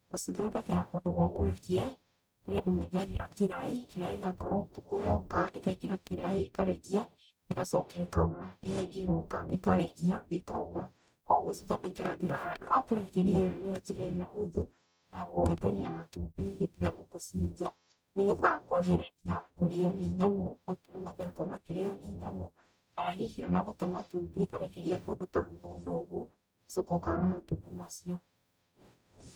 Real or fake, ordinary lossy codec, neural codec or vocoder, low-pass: fake; none; codec, 44.1 kHz, 0.9 kbps, DAC; none